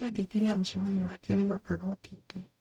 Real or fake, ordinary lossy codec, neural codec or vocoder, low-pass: fake; none; codec, 44.1 kHz, 0.9 kbps, DAC; 19.8 kHz